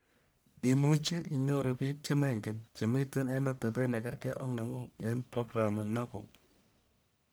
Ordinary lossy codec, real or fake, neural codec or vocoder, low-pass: none; fake; codec, 44.1 kHz, 1.7 kbps, Pupu-Codec; none